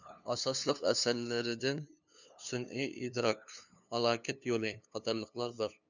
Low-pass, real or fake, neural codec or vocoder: 7.2 kHz; fake; codec, 16 kHz, 2 kbps, FunCodec, trained on LibriTTS, 25 frames a second